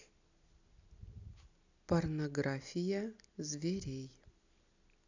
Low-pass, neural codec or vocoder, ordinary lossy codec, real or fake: 7.2 kHz; none; none; real